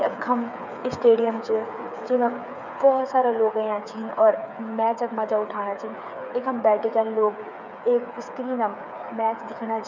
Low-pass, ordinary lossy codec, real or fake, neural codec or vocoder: 7.2 kHz; none; fake; codec, 16 kHz, 8 kbps, FreqCodec, smaller model